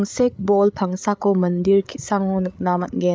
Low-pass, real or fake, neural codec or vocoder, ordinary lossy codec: none; fake; codec, 16 kHz, 8 kbps, FreqCodec, larger model; none